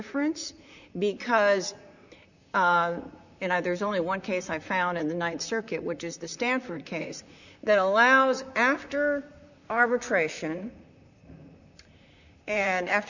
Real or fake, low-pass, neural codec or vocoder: fake; 7.2 kHz; vocoder, 44.1 kHz, 128 mel bands, Pupu-Vocoder